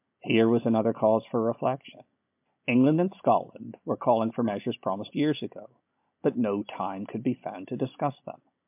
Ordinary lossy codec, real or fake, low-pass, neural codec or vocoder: MP3, 32 kbps; real; 3.6 kHz; none